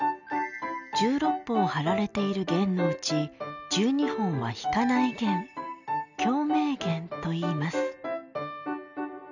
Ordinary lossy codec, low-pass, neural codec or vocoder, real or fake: none; 7.2 kHz; none; real